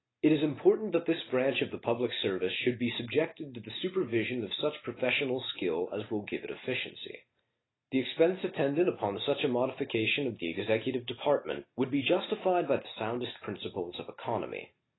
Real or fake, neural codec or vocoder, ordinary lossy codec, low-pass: real; none; AAC, 16 kbps; 7.2 kHz